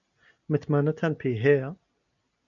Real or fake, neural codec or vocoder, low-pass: real; none; 7.2 kHz